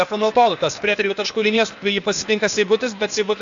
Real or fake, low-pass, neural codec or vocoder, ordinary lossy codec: fake; 7.2 kHz; codec, 16 kHz, 0.8 kbps, ZipCodec; MP3, 64 kbps